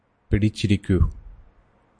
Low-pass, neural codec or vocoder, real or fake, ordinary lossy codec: 9.9 kHz; none; real; AAC, 64 kbps